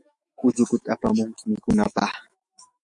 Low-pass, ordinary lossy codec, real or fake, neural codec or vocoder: 10.8 kHz; MP3, 64 kbps; fake; autoencoder, 48 kHz, 128 numbers a frame, DAC-VAE, trained on Japanese speech